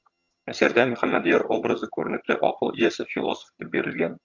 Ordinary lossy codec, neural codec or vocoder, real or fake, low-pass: Opus, 64 kbps; vocoder, 22.05 kHz, 80 mel bands, HiFi-GAN; fake; 7.2 kHz